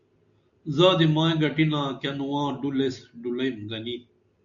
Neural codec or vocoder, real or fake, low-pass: none; real; 7.2 kHz